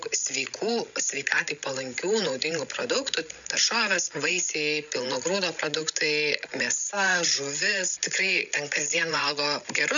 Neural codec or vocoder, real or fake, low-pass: codec, 16 kHz, 16 kbps, FreqCodec, larger model; fake; 7.2 kHz